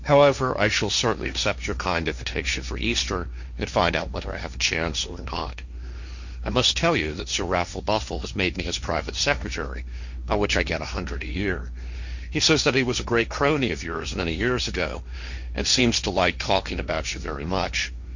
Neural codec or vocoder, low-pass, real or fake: codec, 16 kHz, 1.1 kbps, Voila-Tokenizer; 7.2 kHz; fake